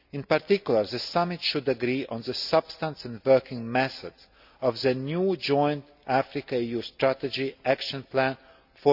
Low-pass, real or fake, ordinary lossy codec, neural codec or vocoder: 5.4 kHz; real; none; none